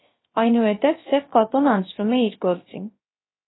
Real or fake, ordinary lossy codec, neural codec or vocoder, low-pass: fake; AAC, 16 kbps; codec, 16 kHz, 0.3 kbps, FocalCodec; 7.2 kHz